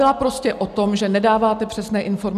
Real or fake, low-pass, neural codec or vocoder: real; 14.4 kHz; none